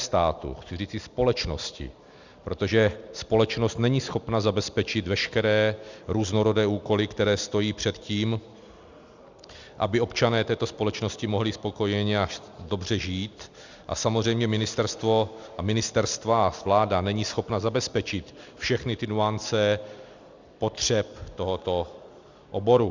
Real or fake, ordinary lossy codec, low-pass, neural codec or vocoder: real; Opus, 64 kbps; 7.2 kHz; none